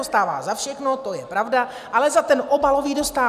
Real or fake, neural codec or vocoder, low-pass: real; none; 14.4 kHz